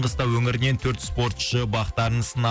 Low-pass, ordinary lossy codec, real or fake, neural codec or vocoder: none; none; real; none